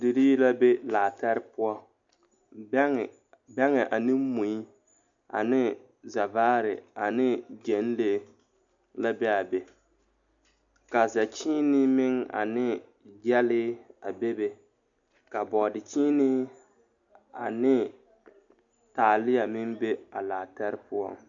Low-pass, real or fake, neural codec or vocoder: 7.2 kHz; real; none